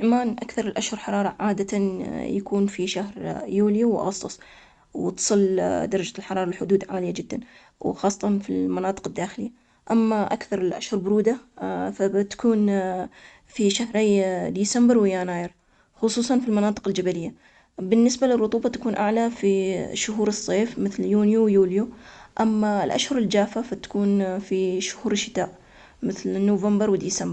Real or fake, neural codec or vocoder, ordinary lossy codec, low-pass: real; none; Opus, 64 kbps; 10.8 kHz